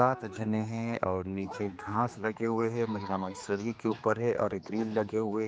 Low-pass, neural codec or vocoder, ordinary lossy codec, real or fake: none; codec, 16 kHz, 2 kbps, X-Codec, HuBERT features, trained on general audio; none; fake